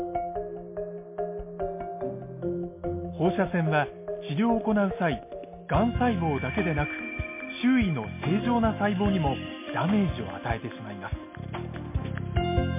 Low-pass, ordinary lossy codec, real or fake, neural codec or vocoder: 3.6 kHz; MP3, 24 kbps; real; none